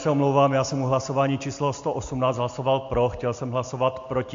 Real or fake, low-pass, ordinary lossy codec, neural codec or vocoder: real; 7.2 kHz; MP3, 48 kbps; none